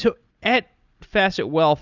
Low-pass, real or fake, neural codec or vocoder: 7.2 kHz; real; none